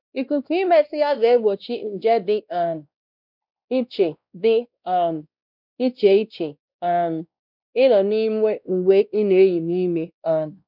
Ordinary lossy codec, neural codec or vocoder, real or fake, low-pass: none; codec, 16 kHz, 1 kbps, X-Codec, WavLM features, trained on Multilingual LibriSpeech; fake; 5.4 kHz